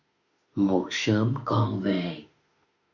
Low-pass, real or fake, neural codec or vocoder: 7.2 kHz; fake; autoencoder, 48 kHz, 32 numbers a frame, DAC-VAE, trained on Japanese speech